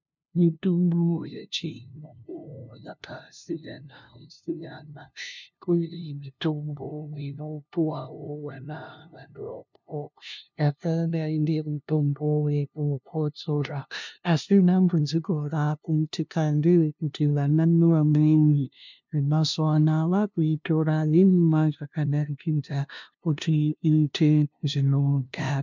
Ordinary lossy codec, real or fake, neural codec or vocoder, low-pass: MP3, 64 kbps; fake; codec, 16 kHz, 0.5 kbps, FunCodec, trained on LibriTTS, 25 frames a second; 7.2 kHz